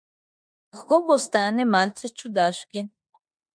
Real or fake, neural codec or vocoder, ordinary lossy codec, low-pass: fake; codec, 16 kHz in and 24 kHz out, 0.9 kbps, LongCat-Audio-Codec, four codebook decoder; MP3, 64 kbps; 9.9 kHz